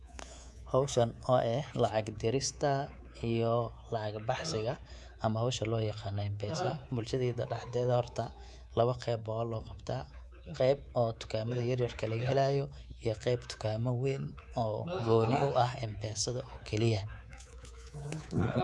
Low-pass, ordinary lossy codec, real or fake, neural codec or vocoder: none; none; fake; codec, 24 kHz, 3.1 kbps, DualCodec